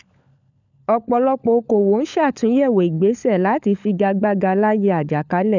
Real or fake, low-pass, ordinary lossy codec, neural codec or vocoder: fake; 7.2 kHz; none; codec, 16 kHz, 16 kbps, FunCodec, trained on LibriTTS, 50 frames a second